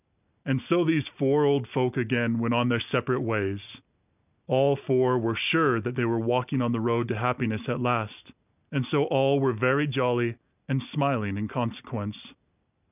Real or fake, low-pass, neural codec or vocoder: real; 3.6 kHz; none